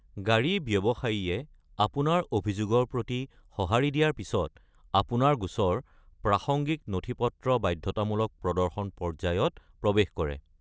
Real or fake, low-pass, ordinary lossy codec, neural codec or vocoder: real; none; none; none